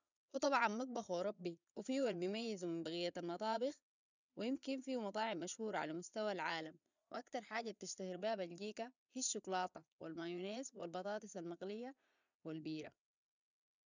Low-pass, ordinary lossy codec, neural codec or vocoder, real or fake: 7.2 kHz; none; vocoder, 22.05 kHz, 80 mel bands, Vocos; fake